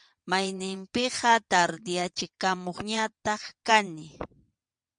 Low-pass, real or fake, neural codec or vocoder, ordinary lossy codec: 9.9 kHz; fake; vocoder, 22.05 kHz, 80 mel bands, WaveNeXt; MP3, 96 kbps